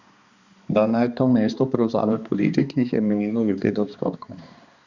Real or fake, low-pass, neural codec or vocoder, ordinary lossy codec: fake; 7.2 kHz; codec, 16 kHz, 2 kbps, X-Codec, HuBERT features, trained on balanced general audio; Opus, 64 kbps